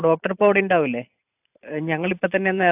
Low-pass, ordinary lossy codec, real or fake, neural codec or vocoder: 3.6 kHz; AAC, 32 kbps; fake; vocoder, 44.1 kHz, 128 mel bands every 512 samples, BigVGAN v2